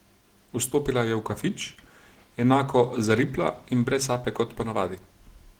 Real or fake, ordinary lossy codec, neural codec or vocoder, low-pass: real; Opus, 16 kbps; none; 19.8 kHz